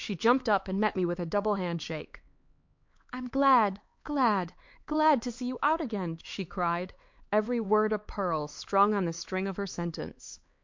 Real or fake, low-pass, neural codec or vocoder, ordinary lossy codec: fake; 7.2 kHz; codec, 16 kHz, 4 kbps, X-Codec, HuBERT features, trained on LibriSpeech; MP3, 48 kbps